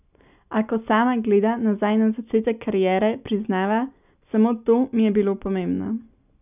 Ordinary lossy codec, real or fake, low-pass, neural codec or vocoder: none; real; 3.6 kHz; none